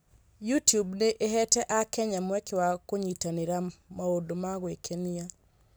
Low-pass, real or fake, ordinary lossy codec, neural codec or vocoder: none; real; none; none